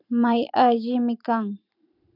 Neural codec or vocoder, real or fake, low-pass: vocoder, 44.1 kHz, 128 mel bands every 512 samples, BigVGAN v2; fake; 5.4 kHz